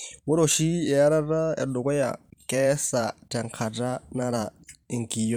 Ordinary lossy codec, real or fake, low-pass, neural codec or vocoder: none; fake; 19.8 kHz; vocoder, 44.1 kHz, 128 mel bands every 512 samples, BigVGAN v2